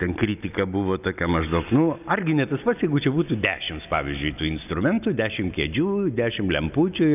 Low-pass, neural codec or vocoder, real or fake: 3.6 kHz; none; real